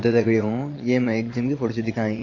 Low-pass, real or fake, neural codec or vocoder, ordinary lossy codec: 7.2 kHz; fake; vocoder, 22.05 kHz, 80 mel bands, Vocos; AAC, 32 kbps